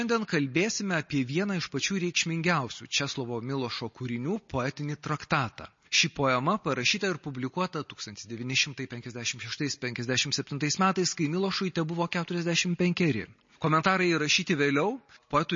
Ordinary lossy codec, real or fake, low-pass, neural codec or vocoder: MP3, 32 kbps; real; 7.2 kHz; none